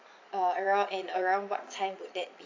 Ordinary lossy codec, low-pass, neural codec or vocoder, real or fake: AAC, 48 kbps; 7.2 kHz; vocoder, 22.05 kHz, 80 mel bands, Vocos; fake